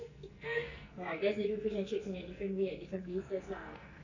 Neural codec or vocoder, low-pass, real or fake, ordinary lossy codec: codec, 44.1 kHz, 2.6 kbps, SNAC; 7.2 kHz; fake; none